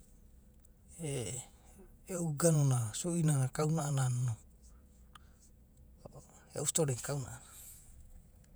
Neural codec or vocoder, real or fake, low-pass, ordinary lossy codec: vocoder, 48 kHz, 128 mel bands, Vocos; fake; none; none